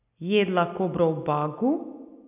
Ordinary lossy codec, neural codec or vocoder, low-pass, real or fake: none; none; 3.6 kHz; real